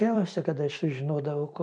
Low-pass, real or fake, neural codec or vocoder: 9.9 kHz; fake; vocoder, 44.1 kHz, 128 mel bands every 256 samples, BigVGAN v2